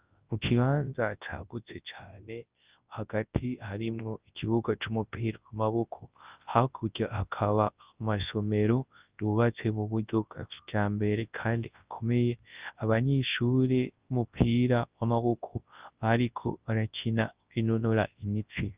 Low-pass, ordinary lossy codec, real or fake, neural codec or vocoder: 3.6 kHz; Opus, 32 kbps; fake; codec, 24 kHz, 0.9 kbps, WavTokenizer, large speech release